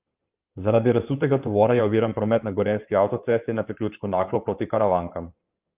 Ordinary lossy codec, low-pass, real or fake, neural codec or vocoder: Opus, 24 kbps; 3.6 kHz; fake; codec, 16 kHz in and 24 kHz out, 2.2 kbps, FireRedTTS-2 codec